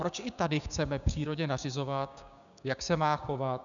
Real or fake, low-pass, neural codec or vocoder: fake; 7.2 kHz; codec, 16 kHz, 6 kbps, DAC